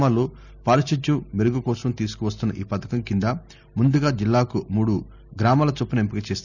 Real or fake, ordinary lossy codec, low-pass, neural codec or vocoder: real; none; 7.2 kHz; none